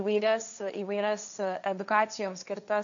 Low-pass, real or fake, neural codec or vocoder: 7.2 kHz; fake; codec, 16 kHz, 1.1 kbps, Voila-Tokenizer